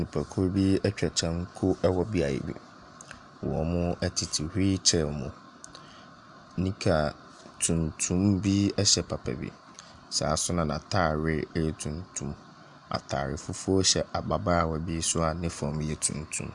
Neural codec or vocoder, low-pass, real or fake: none; 10.8 kHz; real